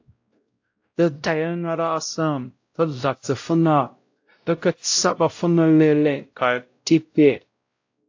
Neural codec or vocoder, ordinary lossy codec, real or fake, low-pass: codec, 16 kHz, 0.5 kbps, X-Codec, WavLM features, trained on Multilingual LibriSpeech; AAC, 48 kbps; fake; 7.2 kHz